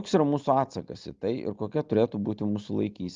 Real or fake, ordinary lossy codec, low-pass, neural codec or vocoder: real; Opus, 32 kbps; 7.2 kHz; none